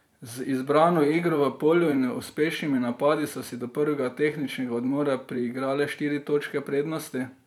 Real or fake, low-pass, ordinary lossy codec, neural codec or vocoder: fake; 19.8 kHz; none; vocoder, 44.1 kHz, 128 mel bands every 512 samples, BigVGAN v2